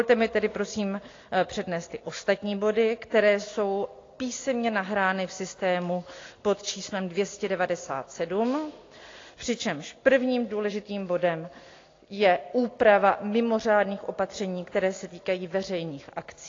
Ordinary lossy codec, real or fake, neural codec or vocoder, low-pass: AAC, 32 kbps; real; none; 7.2 kHz